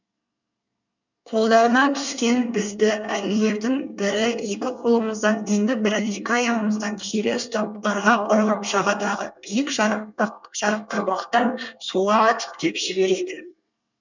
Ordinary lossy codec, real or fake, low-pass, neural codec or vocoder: none; fake; 7.2 kHz; codec, 24 kHz, 1 kbps, SNAC